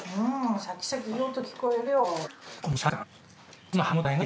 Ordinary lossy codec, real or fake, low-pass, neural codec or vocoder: none; real; none; none